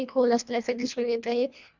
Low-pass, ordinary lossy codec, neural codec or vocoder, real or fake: 7.2 kHz; none; codec, 24 kHz, 1.5 kbps, HILCodec; fake